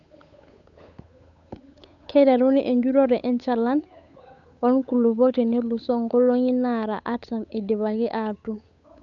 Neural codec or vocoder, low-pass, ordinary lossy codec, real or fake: codec, 16 kHz, 8 kbps, FunCodec, trained on Chinese and English, 25 frames a second; 7.2 kHz; none; fake